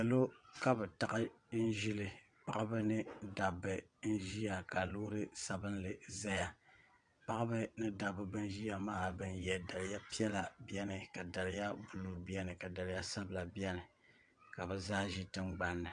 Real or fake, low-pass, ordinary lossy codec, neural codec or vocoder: fake; 9.9 kHz; MP3, 64 kbps; vocoder, 22.05 kHz, 80 mel bands, WaveNeXt